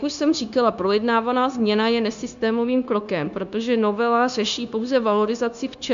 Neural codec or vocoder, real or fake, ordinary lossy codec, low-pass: codec, 16 kHz, 0.9 kbps, LongCat-Audio-Codec; fake; MP3, 64 kbps; 7.2 kHz